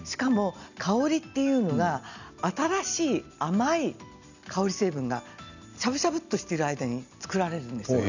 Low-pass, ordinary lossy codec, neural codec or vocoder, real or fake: 7.2 kHz; none; none; real